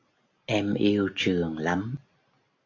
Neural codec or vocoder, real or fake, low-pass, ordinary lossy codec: none; real; 7.2 kHz; AAC, 48 kbps